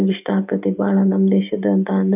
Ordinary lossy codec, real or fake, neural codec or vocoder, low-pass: none; real; none; 3.6 kHz